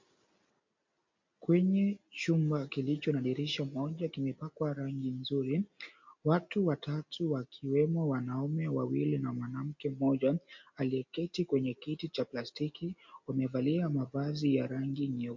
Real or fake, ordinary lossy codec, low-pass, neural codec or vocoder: real; MP3, 48 kbps; 7.2 kHz; none